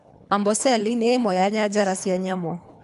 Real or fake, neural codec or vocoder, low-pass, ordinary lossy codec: fake; codec, 24 kHz, 3 kbps, HILCodec; 10.8 kHz; none